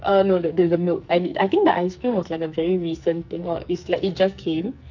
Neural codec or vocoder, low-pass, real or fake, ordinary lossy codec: codec, 44.1 kHz, 2.6 kbps, SNAC; 7.2 kHz; fake; none